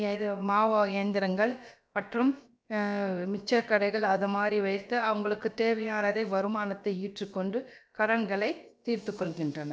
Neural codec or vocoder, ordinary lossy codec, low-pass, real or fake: codec, 16 kHz, about 1 kbps, DyCAST, with the encoder's durations; none; none; fake